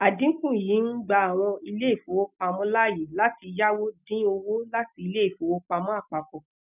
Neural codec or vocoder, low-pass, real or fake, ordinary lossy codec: none; 3.6 kHz; real; none